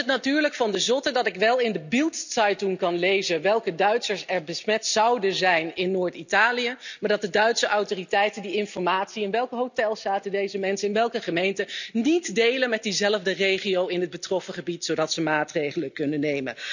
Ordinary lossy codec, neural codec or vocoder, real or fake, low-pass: none; none; real; 7.2 kHz